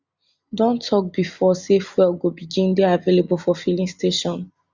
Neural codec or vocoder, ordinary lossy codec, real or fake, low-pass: none; Opus, 64 kbps; real; 7.2 kHz